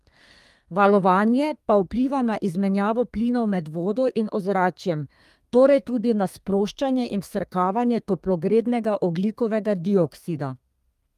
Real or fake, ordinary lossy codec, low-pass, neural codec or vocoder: fake; Opus, 32 kbps; 14.4 kHz; codec, 32 kHz, 1.9 kbps, SNAC